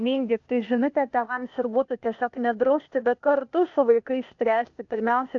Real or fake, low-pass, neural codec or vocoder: fake; 7.2 kHz; codec, 16 kHz, 0.8 kbps, ZipCodec